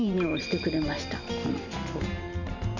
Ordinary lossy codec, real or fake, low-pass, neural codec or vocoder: none; fake; 7.2 kHz; vocoder, 44.1 kHz, 128 mel bands every 512 samples, BigVGAN v2